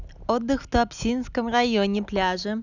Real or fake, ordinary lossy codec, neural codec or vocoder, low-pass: real; none; none; 7.2 kHz